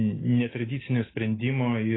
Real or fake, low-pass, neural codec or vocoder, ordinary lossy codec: real; 7.2 kHz; none; AAC, 16 kbps